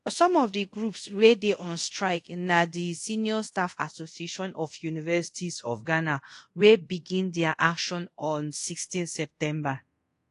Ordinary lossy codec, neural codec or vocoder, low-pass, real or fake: AAC, 48 kbps; codec, 24 kHz, 0.5 kbps, DualCodec; 10.8 kHz; fake